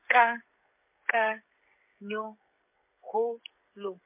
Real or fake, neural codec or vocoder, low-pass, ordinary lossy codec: fake; codec, 16 kHz, 16 kbps, FreqCodec, smaller model; 3.6 kHz; MP3, 24 kbps